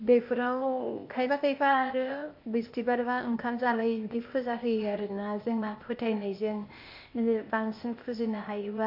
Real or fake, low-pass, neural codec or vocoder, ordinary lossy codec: fake; 5.4 kHz; codec, 16 kHz, 0.8 kbps, ZipCodec; MP3, 32 kbps